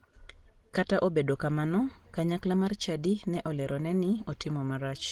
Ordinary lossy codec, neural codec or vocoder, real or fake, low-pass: Opus, 16 kbps; none; real; 19.8 kHz